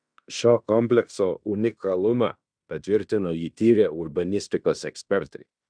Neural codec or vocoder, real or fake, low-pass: codec, 16 kHz in and 24 kHz out, 0.9 kbps, LongCat-Audio-Codec, fine tuned four codebook decoder; fake; 9.9 kHz